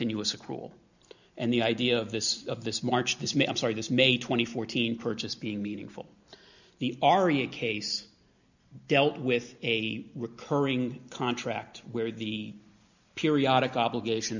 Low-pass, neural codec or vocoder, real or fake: 7.2 kHz; none; real